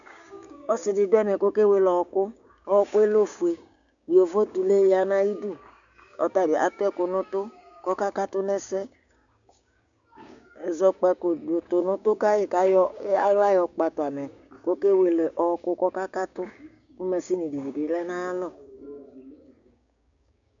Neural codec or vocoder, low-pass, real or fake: codec, 16 kHz, 6 kbps, DAC; 7.2 kHz; fake